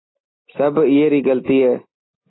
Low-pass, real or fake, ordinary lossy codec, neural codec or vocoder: 7.2 kHz; real; AAC, 16 kbps; none